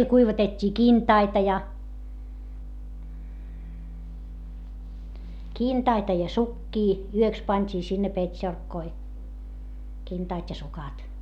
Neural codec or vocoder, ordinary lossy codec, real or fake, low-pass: none; none; real; 19.8 kHz